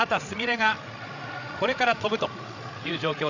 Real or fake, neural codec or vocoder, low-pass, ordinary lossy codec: fake; codec, 16 kHz, 8 kbps, FreqCodec, larger model; 7.2 kHz; none